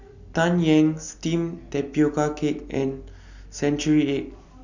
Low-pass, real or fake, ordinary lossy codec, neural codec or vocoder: 7.2 kHz; real; none; none